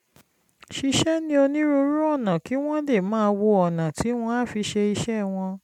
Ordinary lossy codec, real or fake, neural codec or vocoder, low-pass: MP3, 96 kbps; real; none; 19.8 kHz